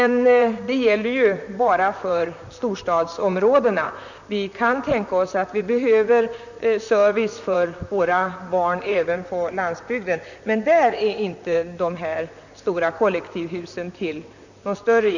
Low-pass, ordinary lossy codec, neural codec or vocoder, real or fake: 7.2 kHz; none; vocoder, 44.1 kHz, 128 mel bands, Pupu-Vocoder; fake